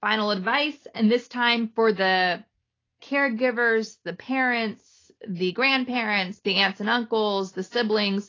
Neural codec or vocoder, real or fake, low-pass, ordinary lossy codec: none; real; 7.2 kHz; AAC, 32 kbps